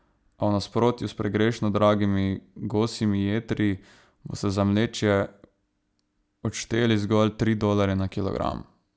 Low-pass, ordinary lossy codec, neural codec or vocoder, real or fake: none; none; none; real